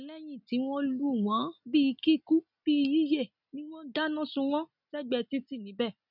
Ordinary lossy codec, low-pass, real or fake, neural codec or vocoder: none; 5.4 kHz; real; none